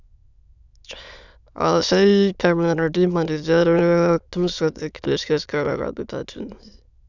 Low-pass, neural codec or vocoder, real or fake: 7.2 kHz; autoencoder, 22.05 kHz, a latent of 192 numbers a frame, VITS, trained on many speakers; fake